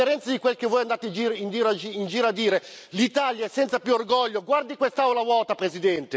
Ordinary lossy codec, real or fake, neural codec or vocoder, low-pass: none; real; none; none